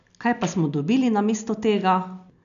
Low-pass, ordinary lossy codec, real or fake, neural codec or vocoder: 7.2 kHz; none; real; none